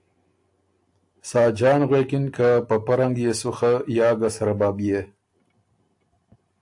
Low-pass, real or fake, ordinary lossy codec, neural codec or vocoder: 10.8 kHz; real; AAC, 64 kbps; none